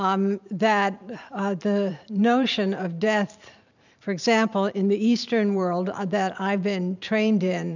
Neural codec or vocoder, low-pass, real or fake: vocoder, 44.1 kHz, 128 mel bands every 512 samples, BigVGAN v2; 7.2 kHz; fake